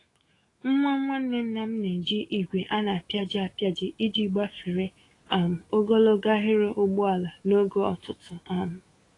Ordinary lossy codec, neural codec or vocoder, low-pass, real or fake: AAC, 32 kbps; autoencoder, 48 kHz, 128 numbers a frame, DAC-VAE, trained on Japanese speech; 10.8 kHz; fake